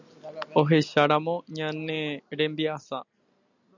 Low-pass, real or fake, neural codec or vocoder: 7.2 kHz; real; none